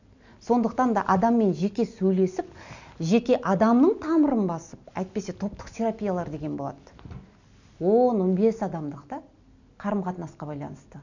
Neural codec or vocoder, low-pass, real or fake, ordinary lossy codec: none; 7.2 kHz; real; none